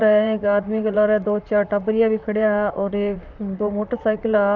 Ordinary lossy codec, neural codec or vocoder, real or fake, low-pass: none; vocoder, 44.1 kHz, 128 mel bands, Pupu-Vocoder; fake; 7.2 kHz